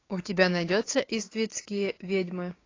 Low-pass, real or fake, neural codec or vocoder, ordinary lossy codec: 7.2 kHz; fake; codec, 16 kHz, 6 kbps, DAC; AAC, 32 kbps